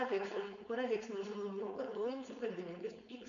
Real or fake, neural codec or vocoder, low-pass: fake; codec, 16 kHz, 4.8 kbps, FACodec; 7.2 kHz